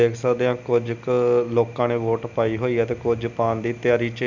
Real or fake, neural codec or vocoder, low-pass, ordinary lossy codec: real; none; 7.2 kHz; none